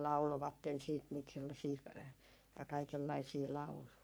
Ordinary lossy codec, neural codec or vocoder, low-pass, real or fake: none; codec, 44.1 kHz, 3.4 kbps, Pupu-Codec; none; fake